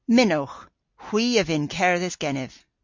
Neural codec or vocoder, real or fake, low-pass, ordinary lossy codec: none; real; 7.2 kHz; MP3, 48 kbps